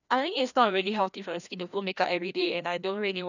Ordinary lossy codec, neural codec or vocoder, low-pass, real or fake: none; codec, 16 kHz, 1 kbps, FreqCodec, larger model; 7.2 kHz; fake